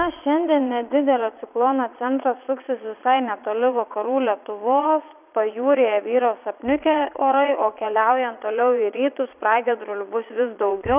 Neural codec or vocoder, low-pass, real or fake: vocoder, 22.05 kHz, 80 mel bands, Vocos; 3.6 kHz; fake